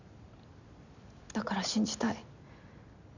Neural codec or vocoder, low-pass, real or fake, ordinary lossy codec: none; 7.2 kHz; real; none